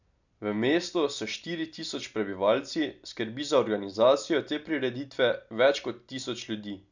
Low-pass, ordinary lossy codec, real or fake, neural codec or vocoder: 7.2 kHz; none; real; none